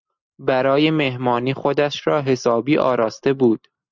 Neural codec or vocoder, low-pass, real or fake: none; 7.2 kHz; real